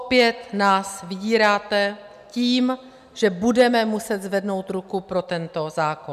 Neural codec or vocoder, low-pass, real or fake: none; 14.4 kHz; real